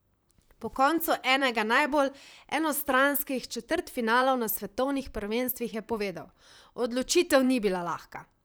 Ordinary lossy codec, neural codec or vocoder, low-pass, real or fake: none; vocoder, 44.1 kHz, 128 mel bands, Pupu-Vocoder; none; fake